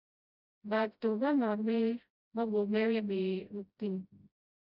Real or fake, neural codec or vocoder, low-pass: fake; codec, 16 kHz, 0.5 kbps, FreqCodec, smaller model; 5.4 kHz